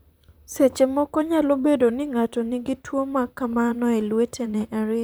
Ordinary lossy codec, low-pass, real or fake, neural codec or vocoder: none; none; fake; vocoder, 44.1 kHz, 128 mel bands, Pupu-Vocoder